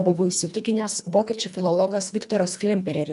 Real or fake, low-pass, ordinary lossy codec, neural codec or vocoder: fake; 10.8 kHz; MP3, 96 kbps; codec, 24 kHz, 1.5 kbps, HILCodec